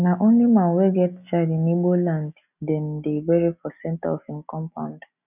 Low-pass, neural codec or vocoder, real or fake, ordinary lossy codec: 3.6 kHz; none; real; none